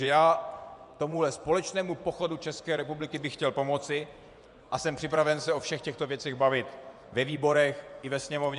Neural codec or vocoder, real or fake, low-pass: vocoder, 24 kHz, 100 mel bands, Vocos; fake; 10.8 kHz